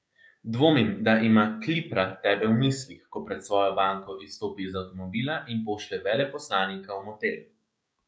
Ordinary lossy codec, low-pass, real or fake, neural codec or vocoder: none; none; fake; codec, 16 kHz, 6 kbps, DAC